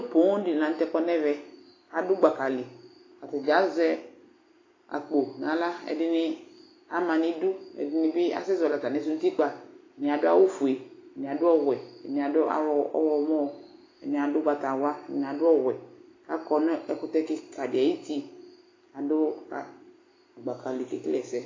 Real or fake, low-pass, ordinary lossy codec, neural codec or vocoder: real; 7.2 kHz; AAC, 32 kbps; none